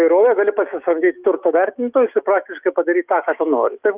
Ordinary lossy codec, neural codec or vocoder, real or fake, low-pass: Opus, 32 kbps; autoencoder, 48 kHz, 128 numbers a frame, DAC-VAE, trained on Japanese speech; fake; 3.6 kHz